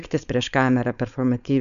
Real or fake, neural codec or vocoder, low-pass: fake; codec, 16 kHz, 4.8 kbps, FACodec; 7.2 kHz